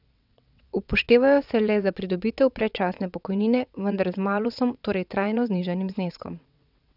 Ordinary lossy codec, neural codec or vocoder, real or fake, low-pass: none; vocoder, 22.05 kHz, 80 mel bands, WaveNeXt; fake; 5.4 kHz